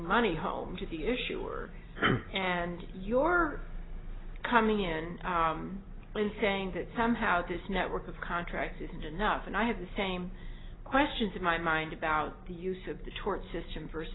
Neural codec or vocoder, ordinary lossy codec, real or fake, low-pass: none; AAC, 16 kbps; real; 7.2 kHz